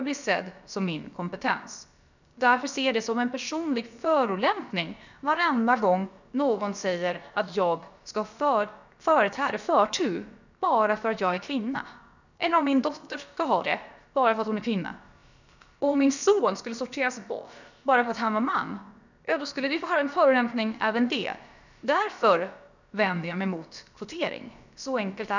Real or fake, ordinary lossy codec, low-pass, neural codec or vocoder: fake; none; 7.2 kHz; codec, 16 kHz, about 1 kbps, DyCAST, with the encoder's durations